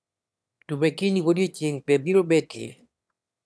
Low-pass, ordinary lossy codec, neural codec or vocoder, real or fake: none; none; autoencoder, 22.05 kHz, a latent of 192 numbers a frame, VITS, trained on one speaker; fake